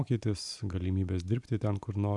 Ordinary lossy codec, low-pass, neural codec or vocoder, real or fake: MP3, 96 kbps; 10.8 kHz; none; real